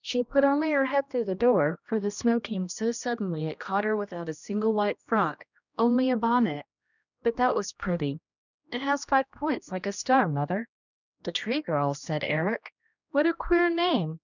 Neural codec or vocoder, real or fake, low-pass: codec, 16 kHz, 1 kbps, X-Codec, HuBERT features, trained on general audio; fake; 7.2 kHz